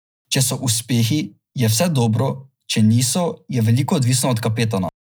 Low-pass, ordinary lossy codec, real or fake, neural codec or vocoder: none; none; real; none